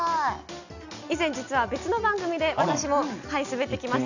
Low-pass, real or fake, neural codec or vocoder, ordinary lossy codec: 7.2 kHz; real; none; none